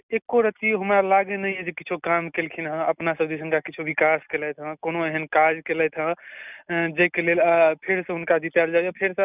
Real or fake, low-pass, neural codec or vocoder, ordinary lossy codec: real; 3.6 kHz; none; none